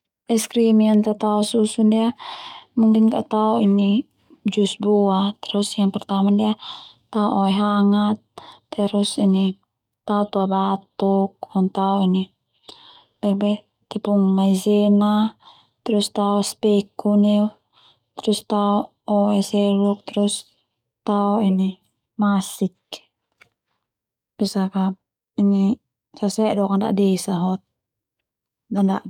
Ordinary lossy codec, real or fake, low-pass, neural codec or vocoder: none; fake; 19.8 kHz; codec, 44.1 kHz, 7.8 kbps, DAC